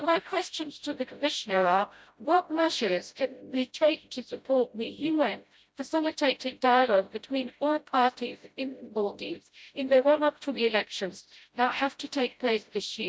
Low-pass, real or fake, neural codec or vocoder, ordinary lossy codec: none; fake; codec, 16 kHz, 0.5 kbps, FreqCodec, smaller model; none